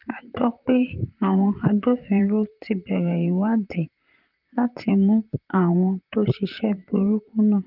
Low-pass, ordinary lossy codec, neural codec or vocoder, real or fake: 5.4 kHz; Opus, 24 kbps; vocoder, 44.1 kHz, 128 mel bands, Pupu-Vocoder; fake